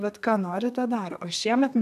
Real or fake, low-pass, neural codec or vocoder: fake; 14.4 kHz; codec, 32 kHz, 1.9 kbps, SNAC